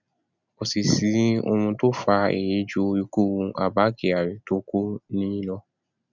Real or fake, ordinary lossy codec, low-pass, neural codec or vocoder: real; none; 7.2 kHz; none